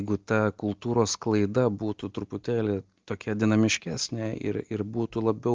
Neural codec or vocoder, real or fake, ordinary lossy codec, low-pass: none; real; Opus, 24 kbps; 7.2 kHz